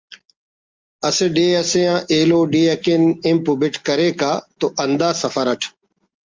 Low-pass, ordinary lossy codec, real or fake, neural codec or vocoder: 7.2 kHz; Opus, 32 kbps; real; none